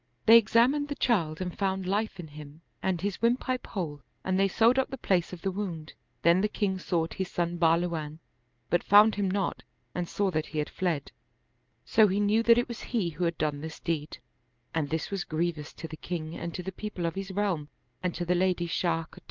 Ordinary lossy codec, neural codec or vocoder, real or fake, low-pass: Opus, 32 kbps; none; real; 7.2 kHz